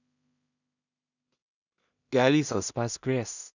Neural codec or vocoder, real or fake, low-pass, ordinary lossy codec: codec, 16 kHz in and 24 kHz out, 0.4 kbps, LongCat-Audio-Codec, two codebook decoder; fake; 7.2 kHz; none